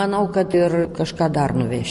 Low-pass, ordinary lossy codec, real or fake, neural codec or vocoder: 14.4 kHz; MP3, 48 kbps; real; none